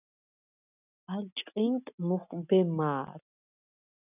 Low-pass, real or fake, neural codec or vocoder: 3.6 kHz; real; none